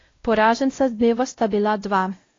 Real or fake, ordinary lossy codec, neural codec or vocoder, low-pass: fake; AAC, 32 kbps; codec, 16 kHz, 0.5 kbps, X-Codec, WavLM features, trained on Multilingual LibriSpeech; 7.2 kHz